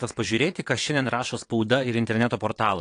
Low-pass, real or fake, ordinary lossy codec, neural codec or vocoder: 9.9 kHz; fake; AAC, 48 kbps; vocoder, 22.05 kHz, 80 mel bands, WaveNeXt